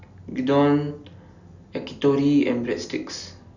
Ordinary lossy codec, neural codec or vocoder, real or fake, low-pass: none; none; real; 7.2 kHz